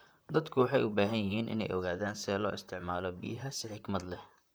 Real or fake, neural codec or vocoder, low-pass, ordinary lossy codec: fake; vocoder, 44.1 kHz, 128 mel bands, Pupu-Vocoder; none; none